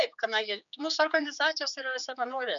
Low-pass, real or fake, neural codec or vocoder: 7.2 kHz; fake; codec, 16 kHz, 4 kbps, X-Codec, HuBERT features, trained on general audio